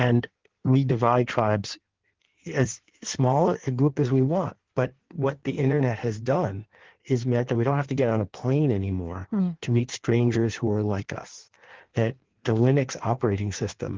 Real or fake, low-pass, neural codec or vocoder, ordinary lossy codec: fake; 7.2 kHz; codec, 16 kHz in and 24 kHz out, 1.1 kbps, FireRedTTS-2 codec; Opus, 16 kbps